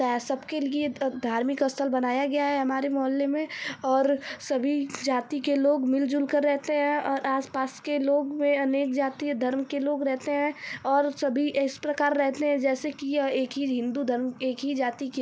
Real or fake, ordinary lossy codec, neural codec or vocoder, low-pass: real; none; none; none